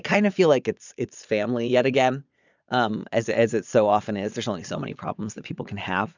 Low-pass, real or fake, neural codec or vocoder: 7.2 kHz; fake; vocoder, 22.05 kHz, 80 mel bands, Vocos